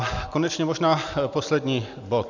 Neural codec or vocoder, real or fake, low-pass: none; real; 7.2 kHz